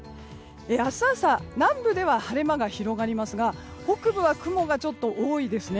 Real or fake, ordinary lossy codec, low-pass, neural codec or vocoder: real; none; none; none